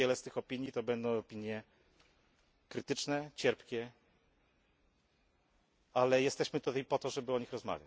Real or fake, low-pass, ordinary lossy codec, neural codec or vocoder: real; none; none; none